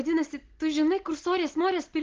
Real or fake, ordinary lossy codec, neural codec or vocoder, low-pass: real; Opus, 16 kbps; none; 7.2 kHz